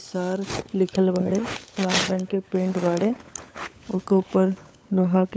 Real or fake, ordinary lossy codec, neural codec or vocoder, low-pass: fake; none; codec, 16 kHz, 16 kbps, FunCodec, trained on LibriTTS, 50 frames a second; none